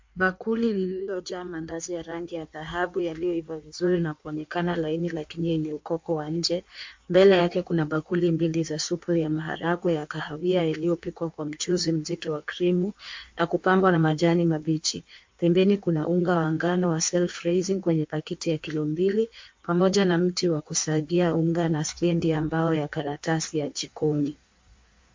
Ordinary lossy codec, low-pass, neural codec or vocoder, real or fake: MP3, 48 kbps; 7.2 kHz; codec, 16 kHz in and 24 kHz out, 1.1 kbps, FireRedTTS-2 codec; fake